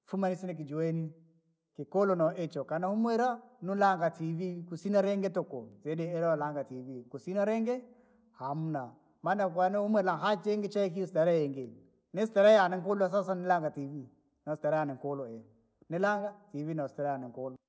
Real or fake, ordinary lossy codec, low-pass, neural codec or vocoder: real; none; none; none